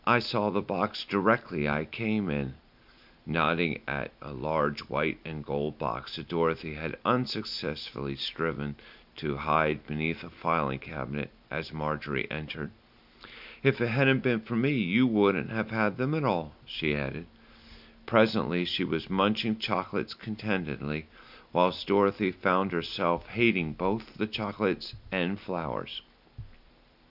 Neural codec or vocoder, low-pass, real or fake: none; 5.4 kHz; real